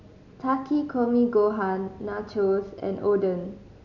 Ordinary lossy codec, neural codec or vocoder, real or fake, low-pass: none; none; real; 7.2 kHz